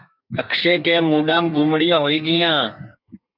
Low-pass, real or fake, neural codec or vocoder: 5.4 kHz; fake; codec, 32 kHz, 1.9 kbps, SNAC